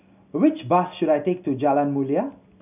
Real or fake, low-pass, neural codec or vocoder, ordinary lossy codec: real; 3.6 kHz; none; none